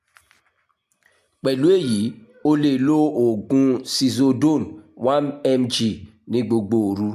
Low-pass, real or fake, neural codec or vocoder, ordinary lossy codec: 14.4 kHz; real; none; MP3, 96 kbps